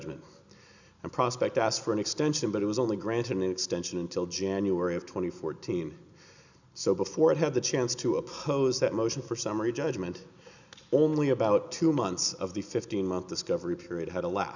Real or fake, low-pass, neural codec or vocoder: real; 7.2 kHz; none